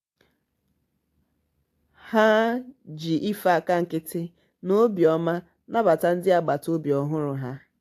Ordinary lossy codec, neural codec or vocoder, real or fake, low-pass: AAC, 64 kbps; none; real; 14.4 kHz